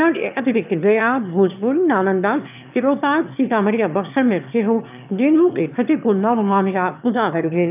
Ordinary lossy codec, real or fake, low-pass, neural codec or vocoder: none; fake; 3.6 kHz; autoencoder, 22.05 kHz, a latent of 192 numbers a frame, VITS, trained on one speaker